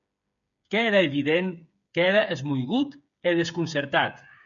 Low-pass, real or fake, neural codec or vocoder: 7.2 kHz; fake; codec, 16 kHz, 8 kbps, FreqCodec, smaller model